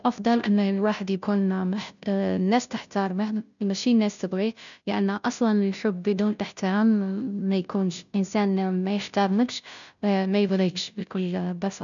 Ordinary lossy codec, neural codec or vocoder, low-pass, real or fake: none; codec, 16 kHz, 0.5 kbps, FunCodec, trained on Chinese and English, 25 frames a second; 7.2 kHz; fake